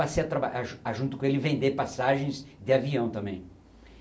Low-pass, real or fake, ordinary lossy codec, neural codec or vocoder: none; real; none; none